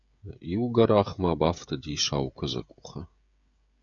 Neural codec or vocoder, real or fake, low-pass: codec, 16 kHz, 16 kbps, FreqCodec, smaller model; fake; 7.2 kHz